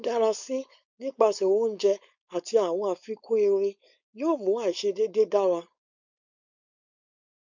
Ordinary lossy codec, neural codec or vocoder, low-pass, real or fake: none; codec, 16 kHz, 4.8 kbps, FACodec; 7.2 kHz; fake